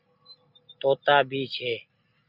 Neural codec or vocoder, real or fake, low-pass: none; real; 5.4 kHz